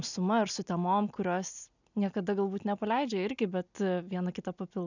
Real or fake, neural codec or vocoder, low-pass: real; none; 7.2 kHz